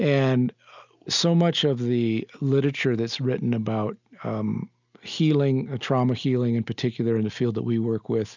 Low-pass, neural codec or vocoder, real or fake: 7.2 kHz; none; real